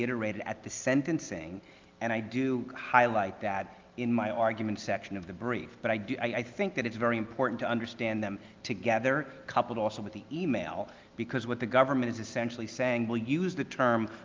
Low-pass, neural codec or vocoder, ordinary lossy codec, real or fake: 7.2 kHz; none; Opus, 24 kbps; real